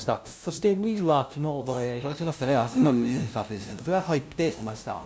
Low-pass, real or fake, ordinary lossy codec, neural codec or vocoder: none; fake; none; codec, 16 kHz, 0.5 kbps, FunCodec, trained on LibriTTS, 25 frames a second